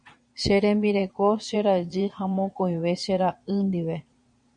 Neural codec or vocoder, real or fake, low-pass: vocoder, 22.05 kHz, 80 mel bands, Vocos; fake; 9.9 kHz